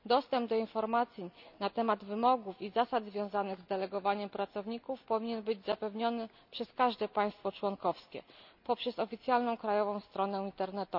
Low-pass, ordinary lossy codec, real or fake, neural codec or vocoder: 5.4 kHz; none; real; none